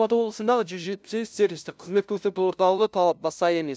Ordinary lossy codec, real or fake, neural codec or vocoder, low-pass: none; fake; codec, 16 kHz, 0.5 kbps, FunCodec, trained on LibriTTS, 25 frames a second; none